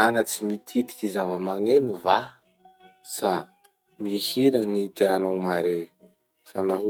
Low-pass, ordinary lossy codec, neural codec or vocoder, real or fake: none; none; codec, 44.1 kHz, 2.6 kbps, SNAC; fake